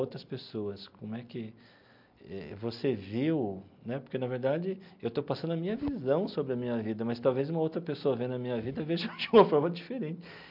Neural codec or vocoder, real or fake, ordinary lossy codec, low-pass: none; real; MP3, 48 kbps; 5.4 kHz